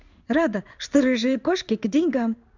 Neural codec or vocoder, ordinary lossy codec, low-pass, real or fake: codec, 16 kHz in and 24 kHz out, 1 kbps, XY-Tokenizer; none; 7.2 kHz; fake